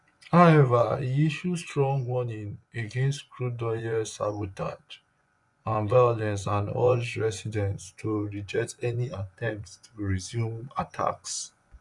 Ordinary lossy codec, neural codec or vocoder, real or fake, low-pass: none; vocoder, 24 kHz, 100 mel bands, Vocos; fake; 10.8 kHz